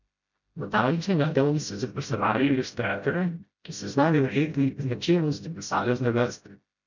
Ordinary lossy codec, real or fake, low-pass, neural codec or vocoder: none; fake; 7.2 kHz; codec, 16 kHz, 0.5 kbps, FreqCodec, smaller model